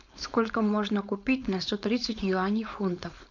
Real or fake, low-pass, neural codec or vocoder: fake; 7.2 kHz; codec, 16 kHz, 4.8 kbps, FACodec